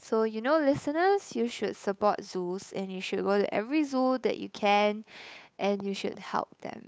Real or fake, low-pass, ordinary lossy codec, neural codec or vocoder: fake; none; none; codec, 16 kHz, 8 kbps, FunCodec, trained on Chinese and English, 25 frames a second